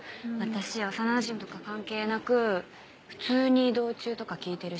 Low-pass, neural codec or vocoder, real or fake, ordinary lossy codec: none; none; real; none